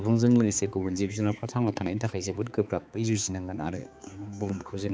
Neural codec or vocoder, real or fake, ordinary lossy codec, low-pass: codec, 16 kHz, 4 kbps, X-Codec, HuBERT features, trained on balanced general audio; fake; none; none